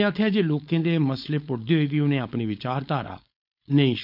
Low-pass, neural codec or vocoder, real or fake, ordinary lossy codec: 5.4 kHz; codec, 16 kHz, 4.8 kbps, FACodec; fake; none